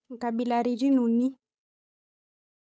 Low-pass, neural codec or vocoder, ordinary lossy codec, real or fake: none; codec, 16 kHz, 8 kbps, FunCodec, trained on Chinese and English, 25 frames a second; none; fake